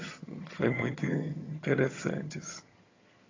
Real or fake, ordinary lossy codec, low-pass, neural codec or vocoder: fake; AAC, 32 kbps; 7.2 kHz; vocoder, 22.05 kHz, 80 mel bands, HiFi-GAN